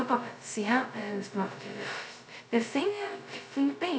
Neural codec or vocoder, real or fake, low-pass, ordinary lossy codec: codec, 16 kHz, 0.2 kbps, FocalCodec; fake; none; none